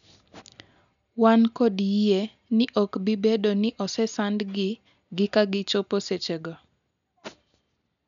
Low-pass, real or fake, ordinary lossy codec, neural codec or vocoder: 7.2 kHz; real; none; none